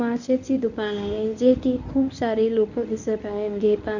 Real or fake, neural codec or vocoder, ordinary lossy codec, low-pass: fake; codec, 24 kHz, 0.9 kbps, WavTokenizer, medium speech release version 1; none; 7.2 kHz